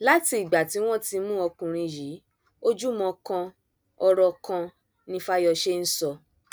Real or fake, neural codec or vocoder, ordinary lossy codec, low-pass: real; none; none; none